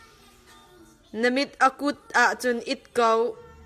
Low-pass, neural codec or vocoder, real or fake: 14.4 kHz; none; real